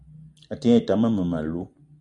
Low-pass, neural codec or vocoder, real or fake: 10.8 kHz; none; real